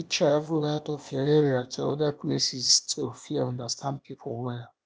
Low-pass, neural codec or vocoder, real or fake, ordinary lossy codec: none; codec, 16 kHz, 0.8 kbps, ZipCodec; fake; none